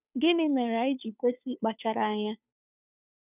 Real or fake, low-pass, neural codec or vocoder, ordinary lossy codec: fake; 3.6 kHz; codec, 16 kHz, 2 kbps, FunCodec, trained on Chinese and English, 25 frames a second; none